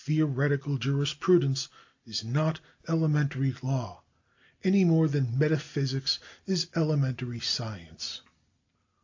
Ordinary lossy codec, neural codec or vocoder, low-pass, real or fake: AAC, 48 kbps; none; 7.2 kHz; real